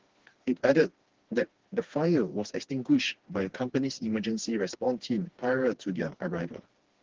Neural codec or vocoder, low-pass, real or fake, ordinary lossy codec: codec, 16 kHz, 2 kbps, FreqCodec, smaller model; 7.2 kHz; fake; Opus, 16 kbps